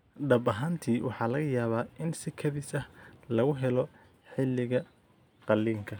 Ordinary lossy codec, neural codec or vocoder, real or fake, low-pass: none; none; real; none